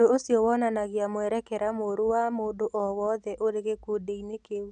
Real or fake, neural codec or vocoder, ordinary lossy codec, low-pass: real; none; none; 9.9 kHz